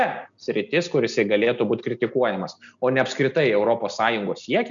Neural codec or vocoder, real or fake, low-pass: none; real; 7.2 kHz